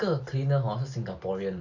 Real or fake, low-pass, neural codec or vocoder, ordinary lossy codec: real; 7.2 kHz; none; none